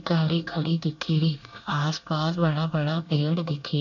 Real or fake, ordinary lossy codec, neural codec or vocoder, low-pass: fake; none; codec, 24 kHz, 1 kbps, SNAC; 7.2 kHz